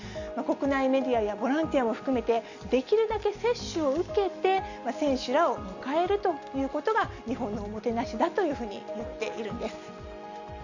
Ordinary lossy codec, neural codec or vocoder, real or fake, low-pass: none; none; real; 7.2 kHz